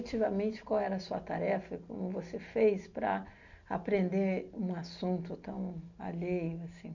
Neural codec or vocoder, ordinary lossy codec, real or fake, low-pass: none; none; real; 7.2 kHz